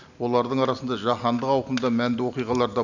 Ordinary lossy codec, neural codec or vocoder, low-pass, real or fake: none; none; 7.2 kHz; real